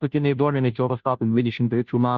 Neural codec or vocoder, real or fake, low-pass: codec, 16 kHz, 0.5 kbps, FunCodec, trained on Chinese and English, 25 frames a second; fake; 7.2 kHz